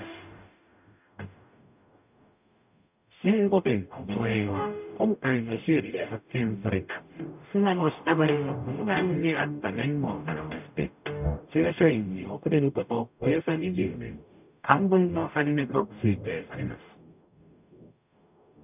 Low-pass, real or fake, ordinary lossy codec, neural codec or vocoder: 3.6 kHz; fake; none; codec, 44.1 kHz, 0.9 kbps, DAC